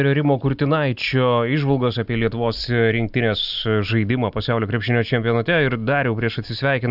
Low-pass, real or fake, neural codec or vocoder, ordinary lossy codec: 5.4 kHz; real; none; Opus, 64 kbps